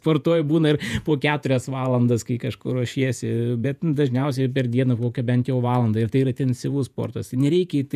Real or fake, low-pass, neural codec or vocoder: fake; 14.4 kHz; vocoder, 48 kHz, 128 mel bands, Vocos